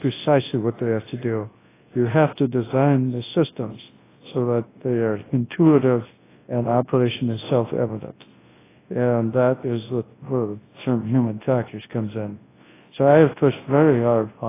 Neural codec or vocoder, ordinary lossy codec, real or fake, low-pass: codec, 24 kHz, 0.9 kbps, WavTokenizer, large speech release; AAC, 16 kbps; fake; 3.6 kHz